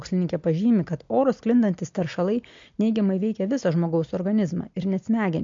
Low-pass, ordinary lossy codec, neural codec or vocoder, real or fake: 7.2 kHz; MP3, 48 kbps; none; real